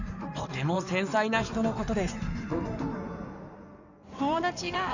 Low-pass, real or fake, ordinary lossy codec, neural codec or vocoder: 7.2 kHz; fake; none; codec, 16 kHz in and 24 kHz out, 2.2 kbps, FireRedTTS-2 codec